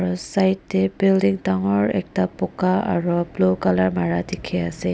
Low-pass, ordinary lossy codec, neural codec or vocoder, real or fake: none; none; none; real